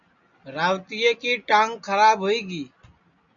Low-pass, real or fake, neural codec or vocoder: 7.2 kHz; real; none